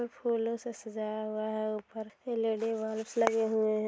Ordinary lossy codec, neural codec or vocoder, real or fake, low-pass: none; none; real; none